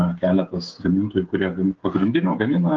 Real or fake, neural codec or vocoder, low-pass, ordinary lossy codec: fake; codec, 16 kHz, 16 kbps, FreqCodec, smaller model; 7.2 kHz; Opus, 16 kbps